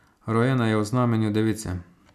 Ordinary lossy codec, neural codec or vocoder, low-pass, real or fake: none; none; 14.4 kHz; real